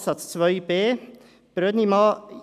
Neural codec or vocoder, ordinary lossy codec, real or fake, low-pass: none; none; real; 14.4 kHz